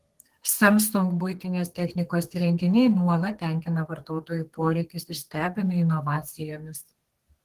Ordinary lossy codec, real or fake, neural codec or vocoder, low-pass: Opus, 16 kbps; fake; codec, 32 kHz, 1.9 kbps, SNAC; 14.4 kHz